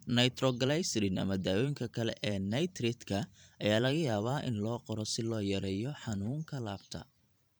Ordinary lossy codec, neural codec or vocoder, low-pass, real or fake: none; vocoder, 44.1 kHz, 128 mel bands every 512 samples, BigVGAN v2; none; fake